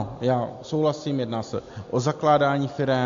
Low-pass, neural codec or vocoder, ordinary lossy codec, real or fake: 7.2 kHz; none; AAC, 48 kbps; real